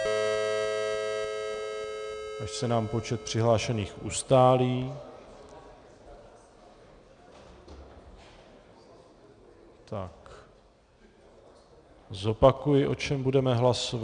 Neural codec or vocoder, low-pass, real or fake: none; 9.9 kHz; real